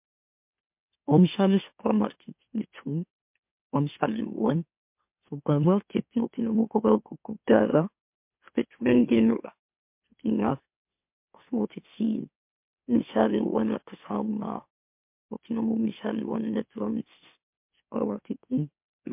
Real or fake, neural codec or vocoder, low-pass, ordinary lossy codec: fake; autoencoder, 44.1 kHz, a latent of 192 numbers a frame, MeloTTS; 3.6 kHz; MP3, 32 kbps